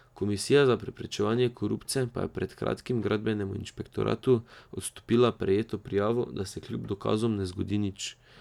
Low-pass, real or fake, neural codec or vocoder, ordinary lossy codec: 19.8 kHz; real; none; none